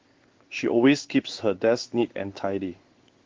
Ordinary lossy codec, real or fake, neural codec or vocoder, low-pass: Opus, 16 kbps; fake; codec, 16 kHz in and 24 kHz out, 1 kbps, XY-Tokenizer; 7.2 kHz